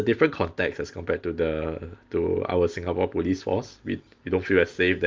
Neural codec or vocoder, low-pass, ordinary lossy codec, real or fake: none; 7.2 kHz; Opus, 24 kbps; real